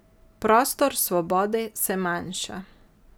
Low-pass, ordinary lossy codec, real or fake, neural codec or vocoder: none; none; real; none